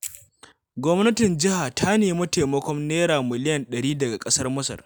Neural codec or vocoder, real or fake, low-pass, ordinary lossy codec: none; real; none; none